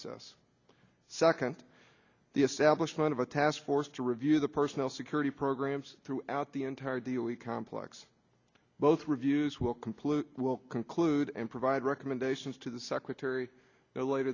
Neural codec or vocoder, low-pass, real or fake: none; 7.2 kHz; real